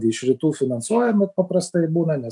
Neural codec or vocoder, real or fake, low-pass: none; real; 10.8 kHz